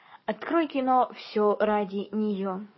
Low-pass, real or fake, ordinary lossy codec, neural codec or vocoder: 7.2 kHz; fake; MP3, 24 kbps; codec, 44.1 kHz, 7.8 kbps, DAC